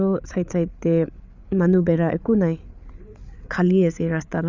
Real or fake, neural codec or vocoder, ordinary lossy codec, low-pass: fake; codec, 16 kHz, 8 kbps, FreqCodec, larger model; none; 7.2 kHz